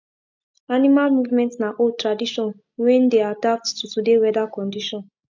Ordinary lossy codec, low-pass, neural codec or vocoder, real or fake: MP3, 64 kbps; 7.2 kHz; none; real